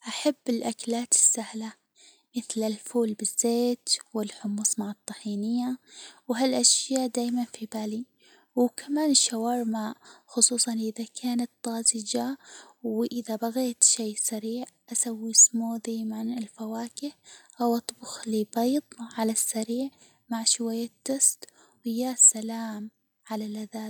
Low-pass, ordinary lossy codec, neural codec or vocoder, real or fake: none; none; none; real